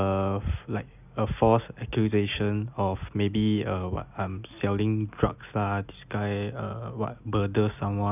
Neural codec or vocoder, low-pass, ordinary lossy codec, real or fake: none; 3.6 kHz; none; real